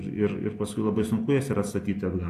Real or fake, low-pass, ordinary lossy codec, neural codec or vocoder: real; 14.4 kHz; AAC, 64 kbps; none